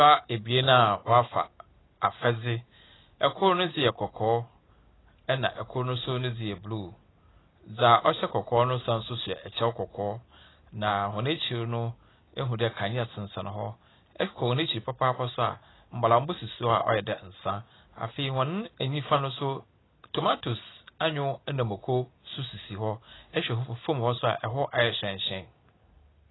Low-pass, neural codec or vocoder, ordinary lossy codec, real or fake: 7.2 kHz; none; AAC, 16 kbps; real